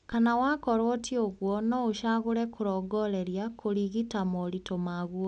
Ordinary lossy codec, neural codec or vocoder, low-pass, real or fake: none; none; 9.9 kHz; real